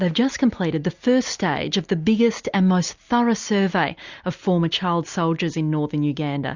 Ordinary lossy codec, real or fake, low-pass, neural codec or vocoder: Opus, 64 kbps; real; 7.2 kHz; none